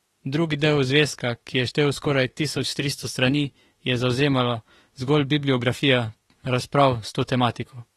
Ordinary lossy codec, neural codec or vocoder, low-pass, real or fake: AAC, 32 kbps; autoencoder, 48 kHz, 32 numbers a frame, DAC-VAE, trained on Japanese speech; 19.8 kHz; fake